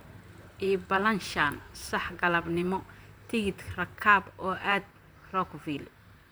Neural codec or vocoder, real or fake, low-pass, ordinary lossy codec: vocoder, 44.1 kHz, 128 mel bands, Pupu-Vocoder; fake; none; none